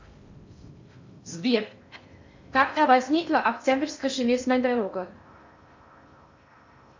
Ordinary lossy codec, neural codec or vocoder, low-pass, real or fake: MP3, 64 kbps; codec, 16 kHz in and 24 kHz out, 0.6 kbps, FocalCodec, streaming, 4096 codes; 7.2 kHz; fake